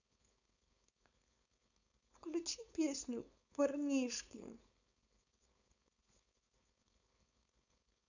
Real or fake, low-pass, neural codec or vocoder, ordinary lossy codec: fake; 7.2 kHz; codec, 16 kHz, 4.8 kbps, FACodec; none